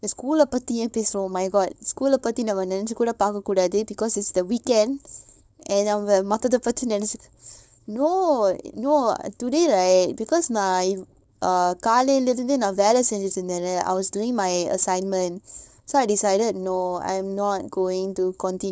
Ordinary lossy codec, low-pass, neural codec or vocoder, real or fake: none; none; codec, 16 kHz, 4.8 kbps, FACodec; fake